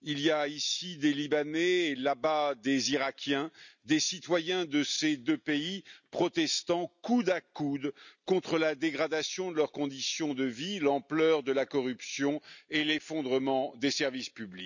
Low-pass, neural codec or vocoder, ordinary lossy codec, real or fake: 7.2 kHz; none; none; real